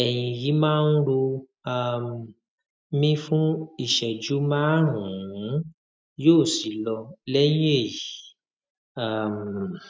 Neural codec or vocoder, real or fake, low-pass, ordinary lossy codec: none; real; none; none